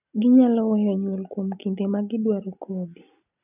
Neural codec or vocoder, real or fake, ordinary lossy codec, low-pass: none; real; none; 3.6 kHz